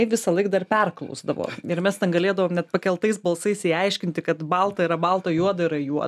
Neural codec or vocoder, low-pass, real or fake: none; 14.4 kHz; real